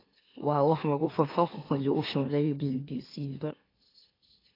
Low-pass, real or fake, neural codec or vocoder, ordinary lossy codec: 5.4 kHz; fake; autoencoder, 44.1 kHz, a latent of 192 numbers a frame, MeloTTS; AAC, 24 kbps